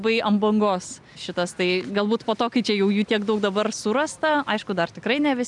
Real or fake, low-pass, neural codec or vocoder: real; 10.8 kHz; none